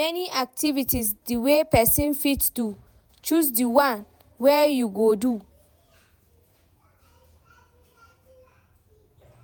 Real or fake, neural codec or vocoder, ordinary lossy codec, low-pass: fake; vocoder, 48 kHz, 128 mel bands, Vocos; none; none